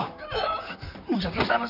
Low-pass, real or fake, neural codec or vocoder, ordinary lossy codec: 5.4 kHz; fake; vocoder, 44.1 kHz, 128 mel bands, Pupu-Vocoder; none